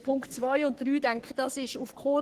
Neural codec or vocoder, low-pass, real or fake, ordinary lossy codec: codec, 44.1 kHz, 3.4 kbps, Pupu-Codec; 14.4 kHz; fake; Opus, 16 kbps